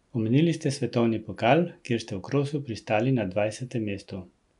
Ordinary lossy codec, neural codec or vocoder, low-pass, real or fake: none; none; 10.8 kHz; real